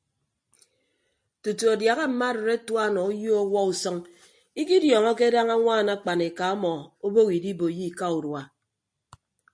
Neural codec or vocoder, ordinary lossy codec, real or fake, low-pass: none; MP3, 48 kbps; real; 9.9 kHz